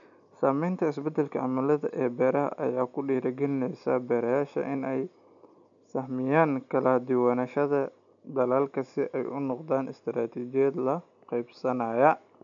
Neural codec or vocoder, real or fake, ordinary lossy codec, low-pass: none; real; none; 7.2 kHz